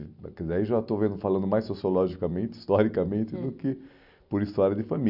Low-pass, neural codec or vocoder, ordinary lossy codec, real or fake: 5.4 kHz; none; none; real